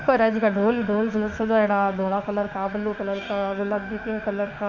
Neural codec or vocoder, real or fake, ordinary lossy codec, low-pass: autoencoder, 48 kHz, 32 numbers a frame, DAC-VAE, trained on Japanese speech; fake; none; 7.2 kHz